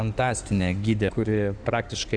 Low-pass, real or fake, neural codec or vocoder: 9.9 kHz; fake; codec, 16 kHz in and 24 kHz out, 2.2 kbps, FireRedTTS-2 codec